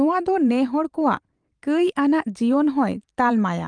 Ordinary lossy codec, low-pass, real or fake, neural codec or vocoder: Opus, 32 kbps; 9.9 kHz; fake; vocoder, 44.1 kHz, 128 mel bands every 512 samples, BigVGAN v2